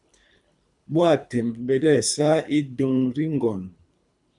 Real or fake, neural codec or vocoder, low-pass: fake; codec, 24 kHz, 3 kbps, HILCodec; 10.8 kHz